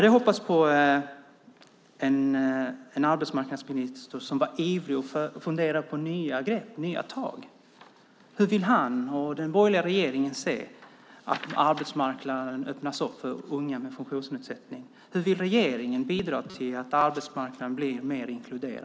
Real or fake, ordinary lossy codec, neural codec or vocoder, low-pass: real; none; none; none